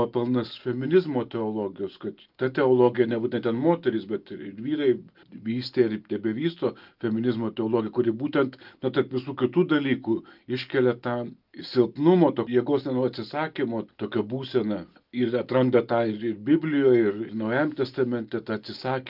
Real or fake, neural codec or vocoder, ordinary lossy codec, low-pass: real; none; Opus, 24 kbps; 5.4 kHz